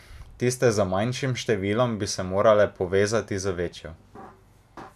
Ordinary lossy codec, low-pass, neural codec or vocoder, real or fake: none; 14.4 kHz; none; real